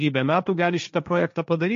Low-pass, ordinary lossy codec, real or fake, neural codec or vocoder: 7.2 kHz; MP3, 48 kbps; fake; codec, 16 kHz, 1.1 kbps, Voila-Tokenizer